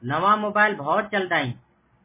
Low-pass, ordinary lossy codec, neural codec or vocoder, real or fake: 3.6 kHz; MP3, 16 kbps; none; real